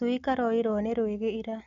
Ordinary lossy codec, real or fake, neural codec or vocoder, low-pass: none; real; none; 7.2 kHz